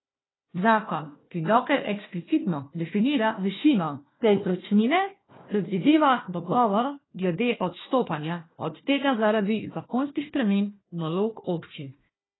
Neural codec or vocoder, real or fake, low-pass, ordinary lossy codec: codec, 16 kHz, 1 kbps, FunCodec, trained on Chinese and English, 50 frames a second; fake; 7.2 kHz; AAC, 16 kbps